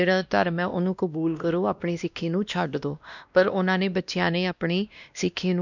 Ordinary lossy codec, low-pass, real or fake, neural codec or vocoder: none; 7.2 kHz; fake; codec, 16 kHz, 1 kbps, X-Codec, WavLM features, trained on Multilingual LibriSpeech